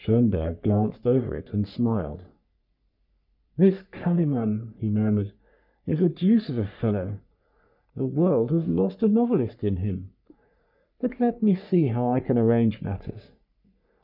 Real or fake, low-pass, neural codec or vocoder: fake; 5.4 kHz; codec, 44.1 kHz, 3.4 kbps, Pupu-Codec